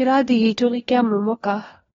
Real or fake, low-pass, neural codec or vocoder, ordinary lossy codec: fake; 7.2 kHz; codec, 16 kHz, 1 kbps, FunCodec, trained on LibriTTS, 50 frames a second; AAC, 24 kbps